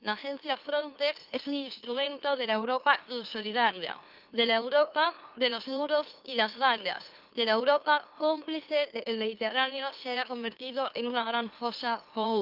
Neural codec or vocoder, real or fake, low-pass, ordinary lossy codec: autoencoder, 44.1 kHz, a latent of 192 numbers a frame, MeloTTS; fake; 5.4 kHz; Opus, 32 kbps